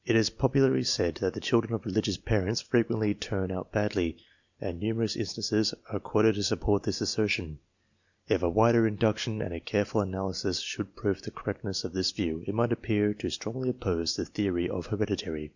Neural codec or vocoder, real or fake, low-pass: none; real; 7.2 kHz